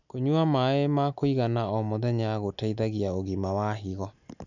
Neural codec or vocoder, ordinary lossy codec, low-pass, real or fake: none; none; 7.2 kHz; real